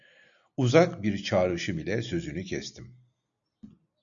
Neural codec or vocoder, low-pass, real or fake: none; 7.2 kHz; real